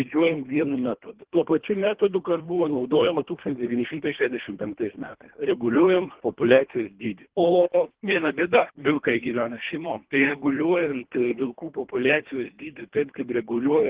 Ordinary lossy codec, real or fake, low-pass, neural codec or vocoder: Opus, 16 kbps; fake; 3.6 kHz; codec, 24 kHz, 1.5 kbps, HILCodec